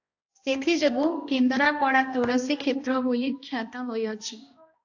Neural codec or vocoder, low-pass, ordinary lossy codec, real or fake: codec, 16 kHz, 1 kbps, X-Codec, HuBERT features, trained on balanced general audio; 7.2 kHz; AAC, 48 kbps; fake